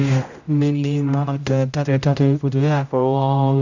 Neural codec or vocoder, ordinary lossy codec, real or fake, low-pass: codec, 16 kHz, 0.5 kbps, X-Codec, HuBERT features, trained on general audio; none; fake; 7.2 kHz